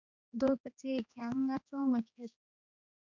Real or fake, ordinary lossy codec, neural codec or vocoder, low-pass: fake; MP3, 64 kbps; codec, 16 kHz, 2 kbps, X-Codec, HuBERT features, trained on general audio; 7.2 kHz